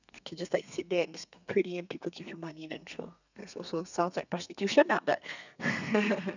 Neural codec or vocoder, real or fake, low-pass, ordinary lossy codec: codec, 32 kHz, 1.9 kbps, SNAC; fake; 7.2 kHz; none